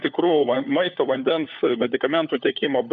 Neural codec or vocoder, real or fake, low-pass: codec, 16 kHz, 4 kbps, FunCodec, trained on LibriTTS, 50 frames a second; fake; 7.2 kHz